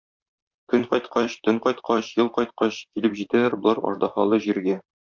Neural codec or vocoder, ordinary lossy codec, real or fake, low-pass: vocoder, 44.1 kHz, 128 mel bands, Pupu-Vocoder; MP3, 64 kbps; fake; 7.2 kHz